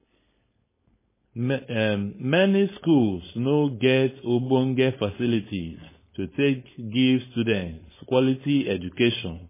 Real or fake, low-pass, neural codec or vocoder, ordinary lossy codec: fake; 3.6 kHz; codec, 16 kHz, 4.8 kbps, FACodec; MP3, 16 kbps